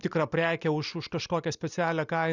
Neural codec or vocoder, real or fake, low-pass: none; real; 7.2 kHz